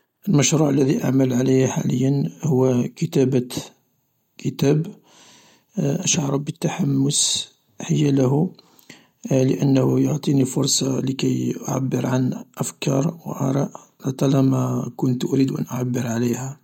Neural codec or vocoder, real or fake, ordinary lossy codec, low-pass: none; real; MP3, 64 kbps; 19.8 kHz